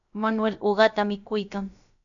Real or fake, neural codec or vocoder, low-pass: fake; codec, 16 kHz, about 1 kbps, DyCAST, with the encoder's durations; 7.2 kHz